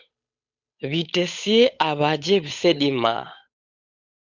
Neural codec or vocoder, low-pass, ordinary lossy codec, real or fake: codec, 16 kHz, 8 kbps, FunCodec, trained on Chinese and English, 25 frames a second; 7.2 kHz; Opus, 64 kbps; fake